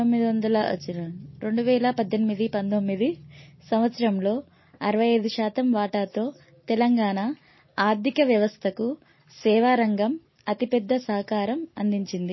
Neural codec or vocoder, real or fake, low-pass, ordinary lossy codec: none; real; 7.2 kHz; MP3, 24 kbps